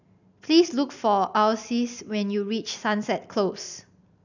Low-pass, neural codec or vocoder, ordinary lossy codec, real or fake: 7.2 kHz; none; none; real